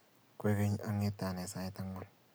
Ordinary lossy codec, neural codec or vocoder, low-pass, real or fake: none; none; none; real